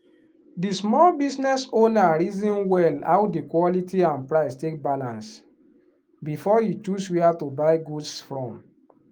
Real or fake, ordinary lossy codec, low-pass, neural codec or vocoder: fake; Opus, 24 kbps; 19.8 kHz; autoencoder, 48 kHz, 128 numbers a frame, DAC-VAE, trained on Japanese speech